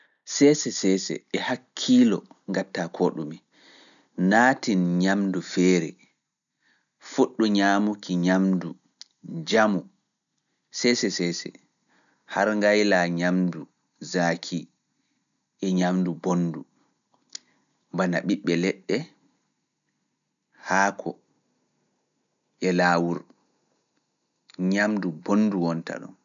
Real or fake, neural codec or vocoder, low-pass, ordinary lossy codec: real; none; 7.2 kHz; none